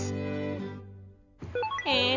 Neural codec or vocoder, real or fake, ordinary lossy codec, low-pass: none; real; none; 7.2 kHz